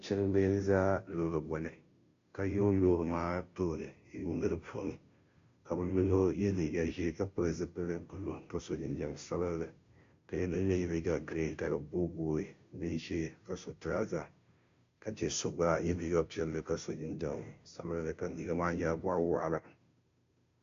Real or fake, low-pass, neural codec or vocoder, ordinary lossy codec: fake; 7.2 kHz; codec, 16 kHz, 0.5 kbps, FunCodec, trained on Chinese and English, 25 frames a second; MP3, 48 kbps